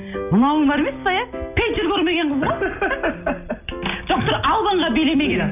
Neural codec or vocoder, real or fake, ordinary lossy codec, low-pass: none; real; none; 3.6 kHz